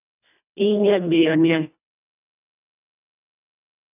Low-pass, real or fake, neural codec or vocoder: 3.6 kHz; fake; codec, 24 kHz, 1.5 kbps, HILCodec